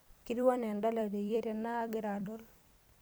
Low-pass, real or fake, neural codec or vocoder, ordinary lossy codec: none; fake; vocoder, 44.1 kHz, 128 mel bands every 256 samples, BigVGAN v2; none